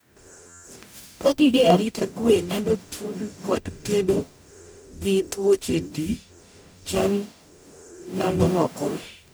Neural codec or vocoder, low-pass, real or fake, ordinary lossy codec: codec, 44.1 kHz, 0.9 kbps, DAC; none; fake; none